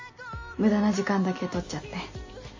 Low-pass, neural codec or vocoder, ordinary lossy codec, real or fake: 7.2 kHz; none; MP3, 32 kbps; real